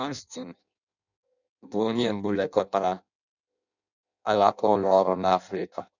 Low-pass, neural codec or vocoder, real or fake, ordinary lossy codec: 7.2 kHz; codec, 16 kHz in and 24 kHz out, 0.6 kbps, FireRedTTS-2 codec; fake; none